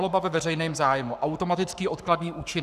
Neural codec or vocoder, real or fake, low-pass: codec, 44.1 kHz, 7.8 kbps, Pupu-Codec; fake; 14.4 kHz